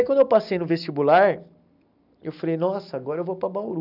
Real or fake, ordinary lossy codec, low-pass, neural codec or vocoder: real; none; 5.4 kHz; none